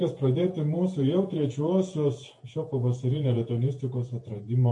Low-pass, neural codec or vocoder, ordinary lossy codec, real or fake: 10.8 kHz; vocoder, 48 kHz, 128 mel bands, Vocos; MP3, 48 kbps; fake